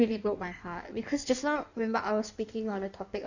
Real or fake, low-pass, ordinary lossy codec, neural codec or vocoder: fake; 7.2 kHz; none; codec, 16 kHz in and 24 kHz out, 1.1 kbps, FireRedTTS-2 codec